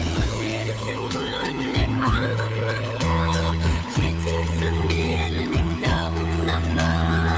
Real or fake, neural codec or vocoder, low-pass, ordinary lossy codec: fake; codec, 16 kHz, 4 kbps, FunCodec, trained on LibriTTS, 50 frames a second; none; none